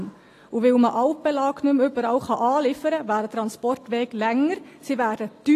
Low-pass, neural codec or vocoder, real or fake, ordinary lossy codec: 14.4 kHz; none; real; AAC, 48 kbps